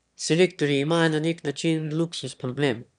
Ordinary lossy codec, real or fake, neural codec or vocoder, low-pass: none; fake; autoencoder, 22.05 kHz, a latent of 192 numbers a frame, VITS, trained on one speaker; 9.9 kHz